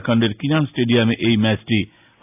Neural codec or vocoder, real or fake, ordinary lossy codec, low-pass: none; real; AAC, 24 kbps; 3.6 kHz